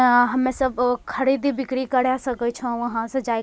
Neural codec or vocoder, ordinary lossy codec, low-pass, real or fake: none; none; none; real